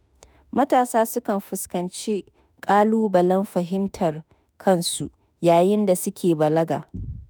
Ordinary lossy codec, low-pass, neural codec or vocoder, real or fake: none; none; autoencoder, 48 kHz, 32 numbers a frame, DAC-VAE, trained on Japanese speech; fake